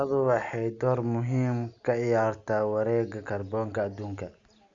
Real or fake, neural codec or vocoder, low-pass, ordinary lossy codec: real; none; 7.2 kHz; Opus, 64 kbps